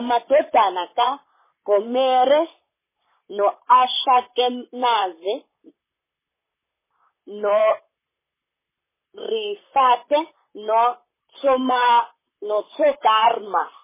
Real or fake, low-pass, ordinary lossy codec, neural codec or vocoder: fake; 3.6 kHz; MP3, 16 kbps; vocoder, 44.1 kHz, 128 mel bands, Pupu-Vocoder